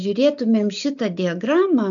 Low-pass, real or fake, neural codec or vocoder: 7.2 kHz; real; none